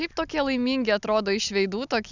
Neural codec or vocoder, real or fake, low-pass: none; real; 7.2 kHz